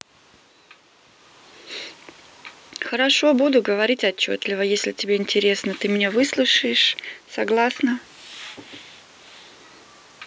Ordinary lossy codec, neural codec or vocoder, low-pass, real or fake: none; none; none; real